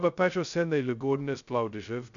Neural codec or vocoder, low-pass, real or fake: codec, 16 kHz, 0.2 kbps, FocalCodec; 7.2 kHz; fake